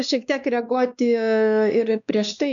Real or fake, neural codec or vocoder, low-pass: fake; codec, 16 kHz, 2 kbps, X-Codec, WavLM features, trained on Multilingual LibriSpeech; 7.2 kHz